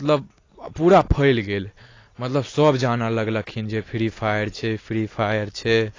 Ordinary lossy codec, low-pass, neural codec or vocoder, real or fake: AAC, 32 kbps; 7.2 kHz; none; real